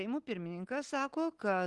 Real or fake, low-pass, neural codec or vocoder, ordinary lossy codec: real; 10.8 kHz; none; Opus, 24 kbps